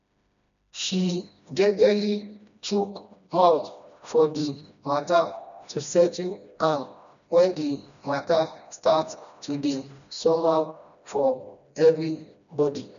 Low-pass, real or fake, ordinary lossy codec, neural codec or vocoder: 7.2 kHz; fake; none; codec, 16 kHz, 1 kbps, FreqCodec, smaller model